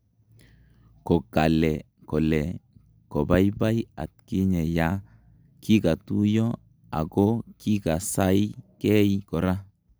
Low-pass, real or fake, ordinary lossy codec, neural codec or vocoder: none; real; none; none